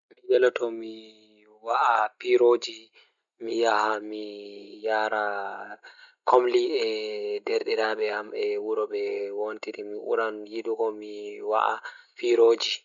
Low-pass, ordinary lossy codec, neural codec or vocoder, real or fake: 7.2 kHz; none; none; real